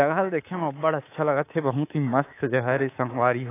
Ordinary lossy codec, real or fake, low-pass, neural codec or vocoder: AAC, 24 kbps; fake; 3.6 kHz; codec, 24 kHz, 3.1 kbps, DualCodec